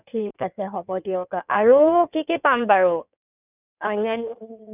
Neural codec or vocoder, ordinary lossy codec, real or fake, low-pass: codec, 16 kHz in and 24 kHz out, 1.1 kbps, FireRedTTS-2 codec; none; fake; 3.6 kHz